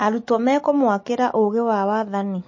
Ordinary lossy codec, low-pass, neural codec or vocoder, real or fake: MP3, 32 kbps; 7.2 kHz; none; real